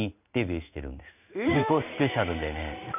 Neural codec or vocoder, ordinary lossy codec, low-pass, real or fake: codec, 16 kHz in and 24 kHz out, 1 kbps, XY-Tokenizer; none; 3.6 kHz; fake